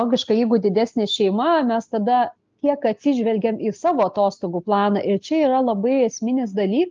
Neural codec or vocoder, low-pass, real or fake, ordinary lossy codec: none; 7.2 kHz; real; Opus, 24 kbps